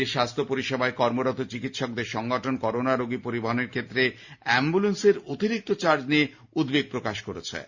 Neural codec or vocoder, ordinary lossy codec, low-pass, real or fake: none; Opus, 64 kbps; 7.2 kHz; real